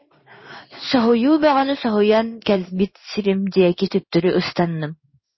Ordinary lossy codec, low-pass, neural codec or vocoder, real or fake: MP3, 24 kbps; 7.2 kHz; codec, 16 kHz in and 24 kHz out, 1 kbps, XY-Tokenizer; fake